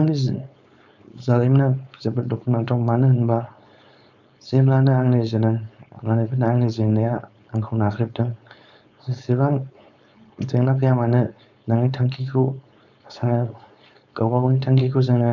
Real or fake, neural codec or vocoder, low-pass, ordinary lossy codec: fake; codec, 16 kHz, 4.8 kbps, FACodec; 7.2 kHz; none